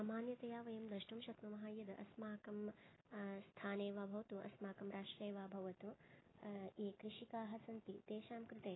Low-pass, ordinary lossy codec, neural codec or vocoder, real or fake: 7.2 kHz; AAC, 16 kbps; none; real